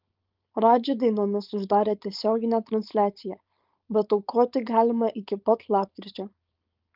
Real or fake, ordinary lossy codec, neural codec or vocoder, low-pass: fake; Opus, 24 kbps; codec, 16 kHz, 4.8 kbps, FACodec; 5.4 kHz